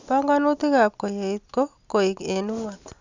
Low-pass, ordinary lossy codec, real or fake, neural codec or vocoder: 7.2 kHz; Opus, 64 kbps; real; none